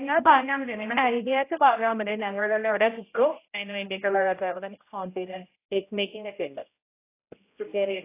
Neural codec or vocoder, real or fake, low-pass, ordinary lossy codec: codec, 16 kHz, 0.5 kbps, X-Codec, HuBERT features, trained on general audio; fake; 3.6 kHz; AAC, 24 kbps